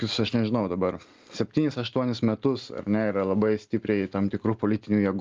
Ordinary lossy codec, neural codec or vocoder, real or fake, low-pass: Opus, 32 kbps; none; real; 7.2 kHz